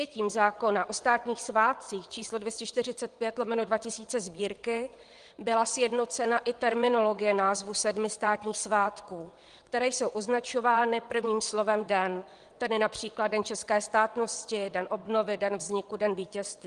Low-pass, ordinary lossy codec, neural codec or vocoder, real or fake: 9.9 kHz; Opus, 24 kbps; vocoder, 22.05 kHz, 80 mel bands, WaveNeXt; fake